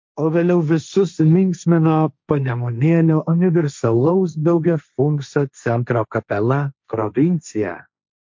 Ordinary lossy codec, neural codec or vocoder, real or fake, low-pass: MP3, 48 kbps; codec, 16 kHz, 1.1 kbps, Voila-Tokenizer; fake; 7.2 kHz